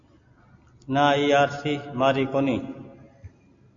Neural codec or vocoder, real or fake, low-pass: none; real; 7.2 kHz